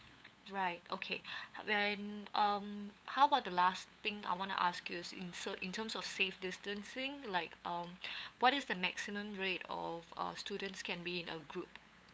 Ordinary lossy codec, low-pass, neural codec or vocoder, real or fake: none; none; codec, 16 kHz, 8 kbps, FunCodec, trained on LibriTTS, 25 frames a second; fake